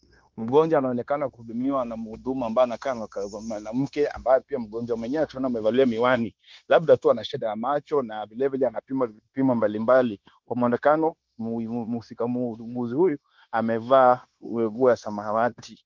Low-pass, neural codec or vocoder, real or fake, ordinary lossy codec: 7.2 kHz; codec, 16 kHz, 0.9 kbps, LongCat-Audio-Codec; fake; Opus, 32 kbps